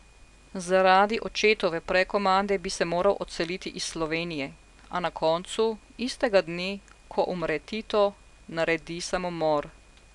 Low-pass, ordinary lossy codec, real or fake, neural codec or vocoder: 10.8 kHz; none; real; none